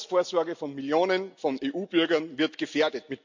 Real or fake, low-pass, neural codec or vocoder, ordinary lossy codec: real; 7.2 kHz; none; MP3, 64 kbps